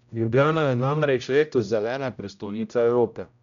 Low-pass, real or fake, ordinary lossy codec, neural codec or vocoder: 7.2 kHz; fake; none; codec, 16 kHz, 0.5 kbps, X-Codec, HuBERT features, trained on general audio